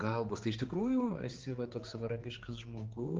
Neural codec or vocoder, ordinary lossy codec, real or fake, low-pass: codec, 16 kHz, 4 kbps, X-Codec, HuBERT features, trained on general audio; Opus, 16 kbps; fake; 7.2 kHz